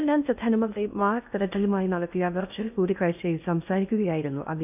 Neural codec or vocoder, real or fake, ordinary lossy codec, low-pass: codec, 16 kHz in and 24 kHz out, 0.6 kbps, FocalCodec, streaming, 2048 codes; fake; none; 3.6 kHz